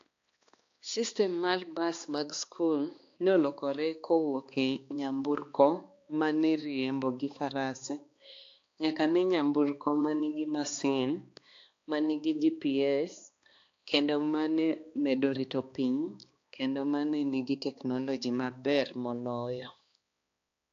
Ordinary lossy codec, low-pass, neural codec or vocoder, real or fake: AAC, 48 kbps; 7.2 kHz; codec, 16 kHz, 2 kbps, X-Codec, HuBERT features, trained on balanced general audio; fake